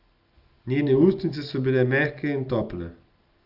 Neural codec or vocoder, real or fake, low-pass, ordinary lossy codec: none; real; 5.4 kHz; Opus, 32 kbps